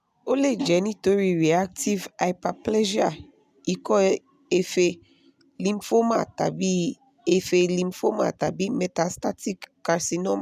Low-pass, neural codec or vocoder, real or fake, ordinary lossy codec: 14.4 kHz; none; real; none